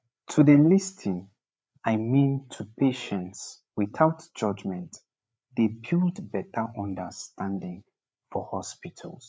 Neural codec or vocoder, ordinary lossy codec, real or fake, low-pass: codec, 16 kHz, 8 kbps, FreqCodec, larger model; none; fake; none